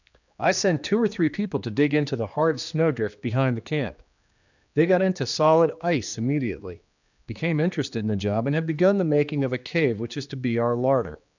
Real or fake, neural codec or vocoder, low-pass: fake; codec, 16 kHz, 2 kbps, X-Codec, HuBERT features, trained on general audio; 7.2 kHz